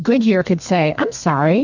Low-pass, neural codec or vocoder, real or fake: 7.2 kHz; codec, 32 kHz, 1.9 kbps, SNAC; fake